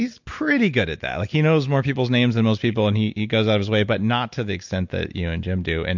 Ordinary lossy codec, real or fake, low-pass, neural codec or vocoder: MP3, 64 kbps; real; 7.2 kHz; none